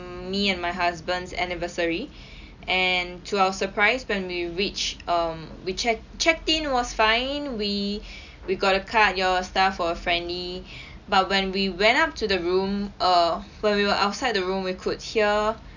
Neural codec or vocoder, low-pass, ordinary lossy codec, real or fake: none; 7.2 kHz; none; real